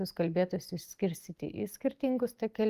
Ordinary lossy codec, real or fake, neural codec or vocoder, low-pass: Opus, 32 kbps; real; none; 14.4 kHz